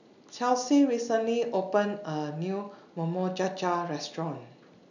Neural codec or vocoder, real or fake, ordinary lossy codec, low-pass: none; real; none; 7.2 kHz